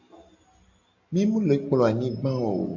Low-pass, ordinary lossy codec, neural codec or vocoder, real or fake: 7.2 kHz; AAC, 48 kbps; none; real